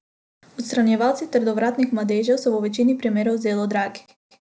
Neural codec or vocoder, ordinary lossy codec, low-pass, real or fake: none; none; none; real